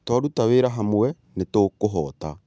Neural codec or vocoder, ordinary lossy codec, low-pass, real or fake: none; none; none; real